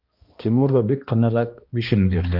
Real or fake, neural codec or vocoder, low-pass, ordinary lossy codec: fake; codec, 16 kHz, 1 kbps, X-Codec, HuBERT features, trained on balanced general audio; 5.4 kHz; Opus, 32 kbps